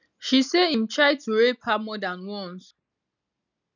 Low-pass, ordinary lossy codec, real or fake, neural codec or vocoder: 7.2 kHz; none; real; none